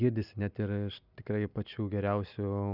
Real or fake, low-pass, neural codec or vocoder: real; 5.4 kHz; none